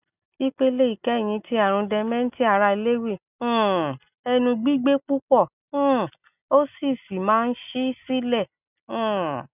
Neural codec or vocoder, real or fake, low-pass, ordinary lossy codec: none; real; 3.6 kHz; none